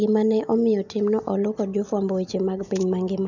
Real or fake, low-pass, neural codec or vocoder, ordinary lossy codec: real; 7.2 kHz; none; none